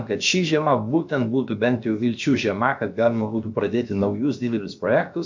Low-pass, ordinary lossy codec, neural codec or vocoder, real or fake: 7.2 kHz; MP3, 64 kbps; codec, 16 kHz, about 1 kbps, DyCAST, with the encoder's durations; fake